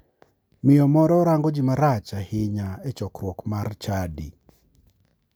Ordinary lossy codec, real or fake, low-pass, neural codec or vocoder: none; real; none; none